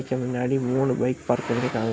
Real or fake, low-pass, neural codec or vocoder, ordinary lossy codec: real; none; none; none